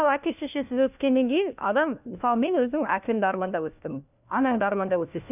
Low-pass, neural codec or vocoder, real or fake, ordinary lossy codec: 3.6 kHz; codec, 16 kHz, 1 kbps, FunCodec, trained on LibriTTS, 50 frames a second; fake; none